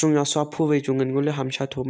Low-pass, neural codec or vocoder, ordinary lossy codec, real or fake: none; none; none; real